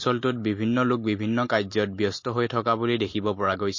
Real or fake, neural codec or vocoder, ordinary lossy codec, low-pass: real; none; MP3, 32 kbps; 7.2 kHz